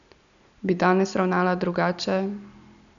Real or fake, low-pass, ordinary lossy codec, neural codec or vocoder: real; 7.2 kHz; none; none